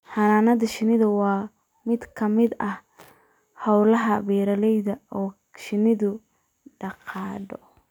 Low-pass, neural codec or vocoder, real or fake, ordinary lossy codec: 19.8 kHz; vocoder, 44.1 kHz, 128 mel bands every 256 samples, BigVGAN v2; fake; none